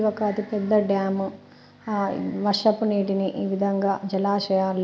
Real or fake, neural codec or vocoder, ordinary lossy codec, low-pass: real; none; none; none